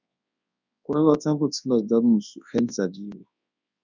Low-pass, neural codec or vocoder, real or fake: 7.2 kHz; codec, 24 kHz, 0.9 kbps, WavTokenizer, large speech release; fake